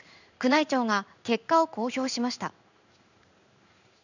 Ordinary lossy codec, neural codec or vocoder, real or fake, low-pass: none; codec, 16 kHz in and 24 kHz out, 1 kbps, XY-Tokenizer; fake; 7.2 kHz